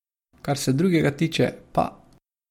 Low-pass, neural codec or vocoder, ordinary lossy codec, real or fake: 19.8 kHz; none; MP3, 64 kbps; real